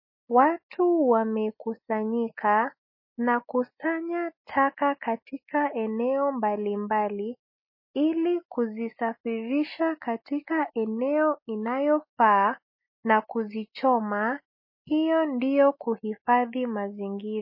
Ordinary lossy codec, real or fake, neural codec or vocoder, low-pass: MP3, 24 kbps; real; none; 5.4 kHz